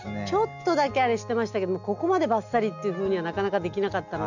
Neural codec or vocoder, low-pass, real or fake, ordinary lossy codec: none; 7.2 kHz; real; none